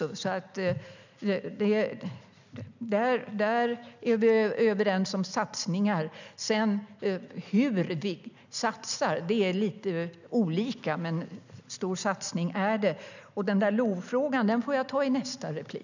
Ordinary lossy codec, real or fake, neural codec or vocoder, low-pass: none; real; none; 7.2 kHz